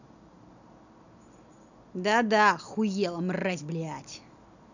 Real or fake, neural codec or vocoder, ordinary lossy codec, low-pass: real; none; none; 7.2 kHz